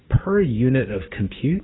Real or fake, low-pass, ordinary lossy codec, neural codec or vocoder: fake; 7.2 kHz; AAC, 16 kbps; codec, 16 kHz, 6 kbps, DAC